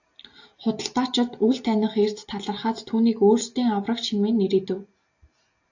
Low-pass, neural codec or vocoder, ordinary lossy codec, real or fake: 7.2 kHz; none; AAC, 48 kbps; real